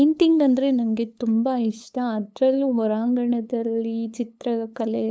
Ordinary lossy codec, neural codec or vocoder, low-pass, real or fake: none; codec, 16 kHz, 8 kbps, FunCodec, trained on LibriTTS, 25 frames a second; none; fake